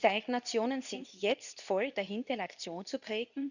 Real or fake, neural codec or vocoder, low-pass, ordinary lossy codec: fake; codec, 24 kHz, 0.9 kbps, WavTokenizer, medium speech release version 2; 7.2 kHz; none